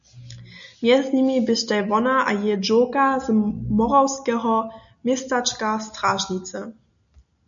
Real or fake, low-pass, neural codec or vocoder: real; 7.2 kHz; none